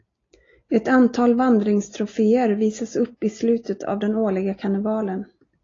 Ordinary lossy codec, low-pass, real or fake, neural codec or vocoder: AAC, 32 kbps; 7.2 kHz; real; none